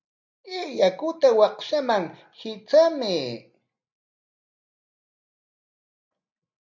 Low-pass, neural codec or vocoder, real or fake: 7.2 kHz; none; real